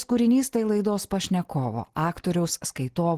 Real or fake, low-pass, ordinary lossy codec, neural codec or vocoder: real; 14.4 kHz; Opus, 16 kbps; none